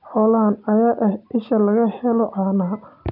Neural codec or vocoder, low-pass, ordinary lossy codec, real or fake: none; 5.4 kHz; none; real